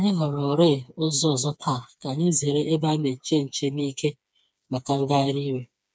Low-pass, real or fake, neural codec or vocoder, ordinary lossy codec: none; fake; codec, 16 kHz, 4 kbps, FreqCodec, smaller model; none